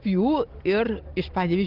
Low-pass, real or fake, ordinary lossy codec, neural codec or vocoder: 5.4 kHz; real; Opus, 16 kbps; none